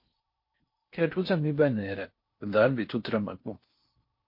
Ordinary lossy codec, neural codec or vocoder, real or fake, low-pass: MP3, 32 kbps; codec, 16 kHz in and 24 kHz out, 0.6 kbps, FocalCodec, streaming, 4096 codes; fake; 5.4 kHz